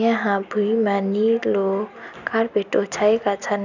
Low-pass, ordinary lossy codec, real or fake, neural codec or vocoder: 7.2 kHz; none; real; none